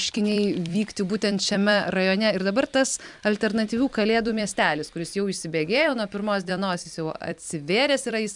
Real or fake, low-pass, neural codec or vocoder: fake; 10.8 kHz; vocoder, 44.1 kHz, 128 mel bands every 256 samples, BigVGAN v2